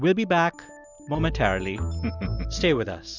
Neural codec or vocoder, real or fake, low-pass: none; real; 7.2 kHz